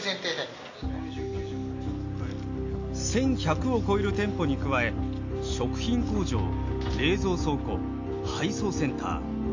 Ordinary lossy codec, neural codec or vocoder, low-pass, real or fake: AAC, 48 kbps; none; 7.2 kHz; real